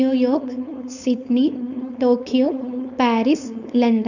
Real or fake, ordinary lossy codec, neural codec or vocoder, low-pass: fake; none; codec, 16 kHz, 4.8 kbps, FACodec; 7.2 kHz